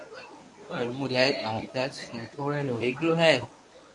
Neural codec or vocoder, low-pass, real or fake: codec, 24 kHz, 0.9 kbps, WavTokenizer, medium speech release version 1; 10.8 kHz; fake